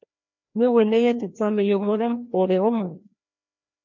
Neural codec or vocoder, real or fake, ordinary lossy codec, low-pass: codec, 16 kHz, 1 kbps, FreqCodec, larger model; fake; MP3, 48 kbps; 7.2 kHz